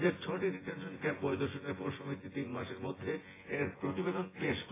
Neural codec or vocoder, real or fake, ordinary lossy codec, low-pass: vocoder, 24 kHz, 100 mel bands, Vocos; fake; AAC, 16 kbps; 3.6 kHz